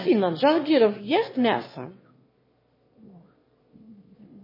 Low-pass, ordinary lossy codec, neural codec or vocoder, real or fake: 5.4 kHz; MP3, 24 kbps; autoencoder, 22.05 kHz, a latent of 192 numbers a frame, VITS, trained on one speaker; fake